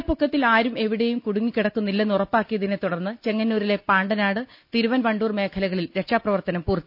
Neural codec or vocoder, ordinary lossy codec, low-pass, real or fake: none; none; 5.4 kHz; real